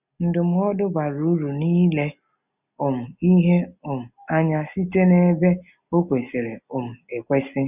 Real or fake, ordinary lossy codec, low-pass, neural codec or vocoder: real; none; 3.6 kHz; none